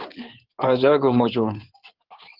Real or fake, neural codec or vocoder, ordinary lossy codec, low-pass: fake; codec, 16 kHz in and 24 kHz out, 2.2 kbps, FireRedTTS-2 codec; Opus, 16 kbps; 5.4 kHz